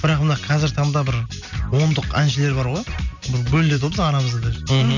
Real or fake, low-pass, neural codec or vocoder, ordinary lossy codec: real; 7.2 kHz; none; none